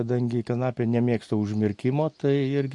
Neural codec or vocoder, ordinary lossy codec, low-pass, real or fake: none; MP3, 48 kbps; 10.8 kHz; real